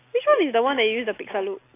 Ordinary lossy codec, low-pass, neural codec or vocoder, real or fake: AAC, 24 kbps; 3.6 kHz; none; real